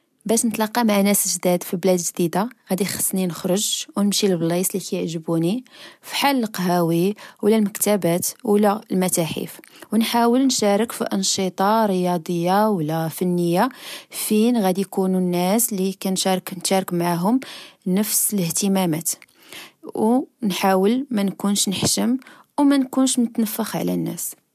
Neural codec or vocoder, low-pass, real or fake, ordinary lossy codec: none; 14.4 kHz; real; MP3, 96 kbps